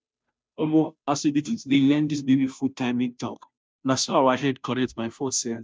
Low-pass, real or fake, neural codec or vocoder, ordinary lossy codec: none; fake; codec, 16 kHz, 0.5 kbps, FunCodec, trained on Chinese and English, 25 frames a second; none